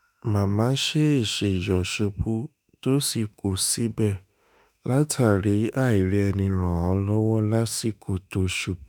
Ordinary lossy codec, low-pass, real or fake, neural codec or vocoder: none; none; fake; autoencoder, 48 kHz, 32 numbers a frame, DAC-VAE, trained on Japanese speech